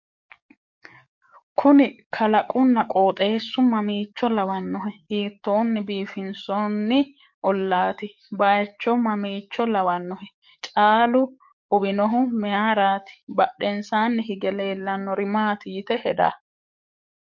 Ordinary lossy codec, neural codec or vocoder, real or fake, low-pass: MP3, 48 kbps; codec, 44.1 kHz, 7.8 kbps, DAC; fake; 7.2 kHz